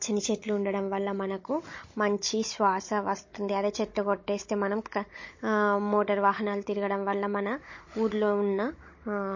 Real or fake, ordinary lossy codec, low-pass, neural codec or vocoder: fake; MP3, 32 kbps; 7.2 kHz; codec, 16 kHz, 16 kbps, FunCodec, trained on Chinese and English, 50 frames a second